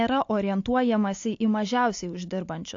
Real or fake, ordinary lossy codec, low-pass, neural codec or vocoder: real; AAC, 48 kbps; 7.2 kHz; none